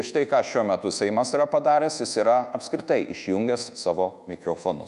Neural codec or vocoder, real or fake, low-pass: codec, 24 kHz, 1.2 kbps, DualCodec; fake; 10.8 kHz